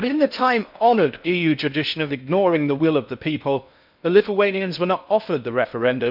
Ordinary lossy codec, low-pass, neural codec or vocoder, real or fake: none; 5.4 kHz; codec, 16 kHz in and 24 kHz out, 0.6 kbps, FocalCodec, streaming, 4096 codes; fake